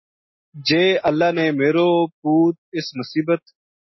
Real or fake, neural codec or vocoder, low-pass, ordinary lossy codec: real; none; 7.2 kHz; MP3, 24 kbps